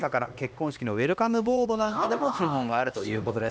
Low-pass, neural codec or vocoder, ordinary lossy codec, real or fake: none; codec, 16 kHz, 2 kbps, X-Codec, HuBERT features, trained on LibriSpeech; none; fake